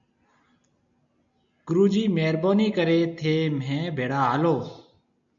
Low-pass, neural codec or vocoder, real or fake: 7.2 kHz; none; real